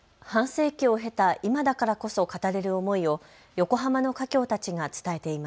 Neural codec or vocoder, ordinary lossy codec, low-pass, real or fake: none; none; none; real